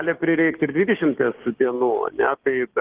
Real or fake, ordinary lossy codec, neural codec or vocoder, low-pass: fake; Opus, 16 kbps; codec, 16 kHz, 6 kbps, DAC; 3.6 kHz